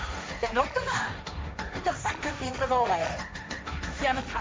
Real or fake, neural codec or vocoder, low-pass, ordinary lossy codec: fake; codec, 16 kHz, 1.1 kbps, Voila-Tokenizer; none; none